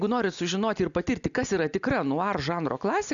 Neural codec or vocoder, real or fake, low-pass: none; real; 7.2 kHz